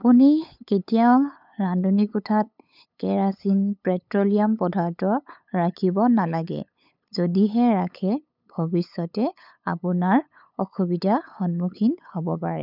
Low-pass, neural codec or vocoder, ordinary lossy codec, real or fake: 5.4 kHz; codec, 16 kHz, 8 kbps, FunCodec, trained on LibriTTS, 25 frames a second; none; fake